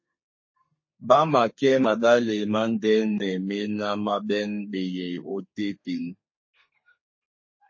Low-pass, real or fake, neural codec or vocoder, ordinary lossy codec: 7.2 kHz; fake; codec, 32 kHz, 1.9 kbps, SNAC; MP3, 32 kbps